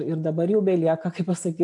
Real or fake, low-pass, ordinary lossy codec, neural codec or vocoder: real; 10.8 kHz; AAC, 64 kbps; none